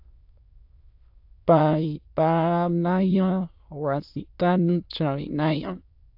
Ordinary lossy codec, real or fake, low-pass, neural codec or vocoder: AAC, 48 kbps; fake; 5.4 kHz; autoencoder, 22.05 kHz, a latent of 192 numbers a frame, VITS, trained on many speakers